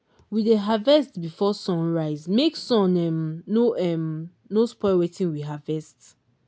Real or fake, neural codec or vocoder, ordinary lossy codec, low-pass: real; none; none; none